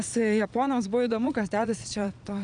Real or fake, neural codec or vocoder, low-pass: fake; vocoder, 22.05 kHz, 80 mel bands, Vocos; 9.9 kHz